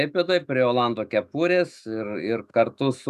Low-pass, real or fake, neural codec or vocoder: 14.4 kHz; fake; autoencoder, 48 kHz, 128 numbers a frame, DAC-VAE, trained on Japanese speech